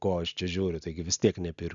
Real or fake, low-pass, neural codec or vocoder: real; 7.2 kHz; none